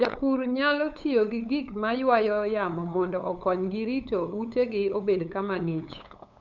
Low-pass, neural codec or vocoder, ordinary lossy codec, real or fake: 7.2 kHz; codec, 16 kHz, 8 kbps, FunCodec, trained on LibriTTS, 25 frames a second; none; fake